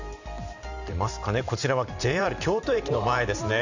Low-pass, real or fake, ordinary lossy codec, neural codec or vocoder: 7.2 kHz; fake; Opus, 64 kbps; vocoder, 44.1 kHz, 128 mel bands every 512 samples, BigVGAN v2